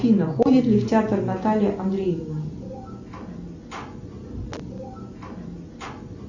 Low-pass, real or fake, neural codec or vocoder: 7.2 kHz; real; none